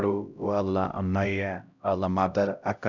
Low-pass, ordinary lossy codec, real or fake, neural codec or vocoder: 7.2 kHz; none; fake; codec, 16 kHz, 0.5 kbps, X-Codec, HuBERT features, trained on LibriSpeech